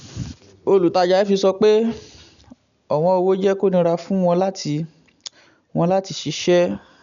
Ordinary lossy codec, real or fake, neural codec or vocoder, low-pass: none; real; none; 7.2 kHz